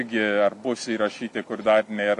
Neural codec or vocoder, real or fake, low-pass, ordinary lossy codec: none; real; 10.8 kHz; AAC, 64 kbps